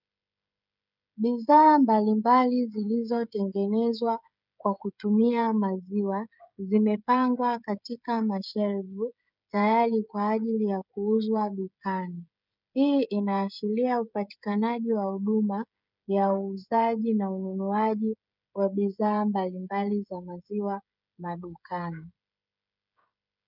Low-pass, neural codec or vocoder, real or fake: 5.4 kHz; codec, 16 kHz, 16 kbps, FreqCodec, smaller model; fake